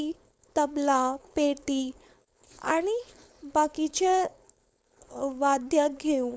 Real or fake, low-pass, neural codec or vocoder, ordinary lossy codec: fake; none; codec, 16 kHz, 4.8 kbps, FACodec; none